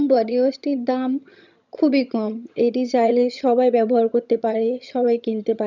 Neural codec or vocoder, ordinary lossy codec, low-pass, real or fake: vocoder, 22.05 kHz, 80 mel bands, HiFi-GAN; none; 7.2 kHz; fake